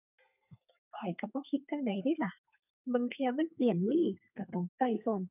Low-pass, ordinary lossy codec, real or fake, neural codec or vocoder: 3.6 kHz; none; fake; codec, 32 kHz, 1.9 kbps, SNAC